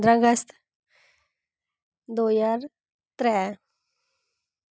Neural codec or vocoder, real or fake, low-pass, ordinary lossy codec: none; real; none; none